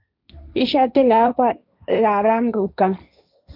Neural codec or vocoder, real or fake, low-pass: codec, 16 kHz, 1.1 kbps, Voila-Tokenizer; fake; 5.4 kHz